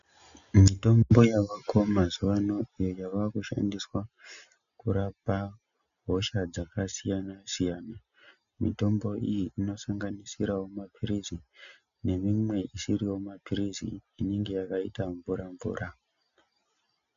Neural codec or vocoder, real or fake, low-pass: none; real; 7.2 kHz